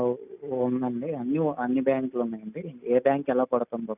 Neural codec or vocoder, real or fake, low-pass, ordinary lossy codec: none; real; 3.6 kHz; none